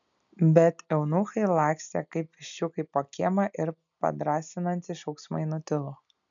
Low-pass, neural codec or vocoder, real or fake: 7.2 kHz; none; real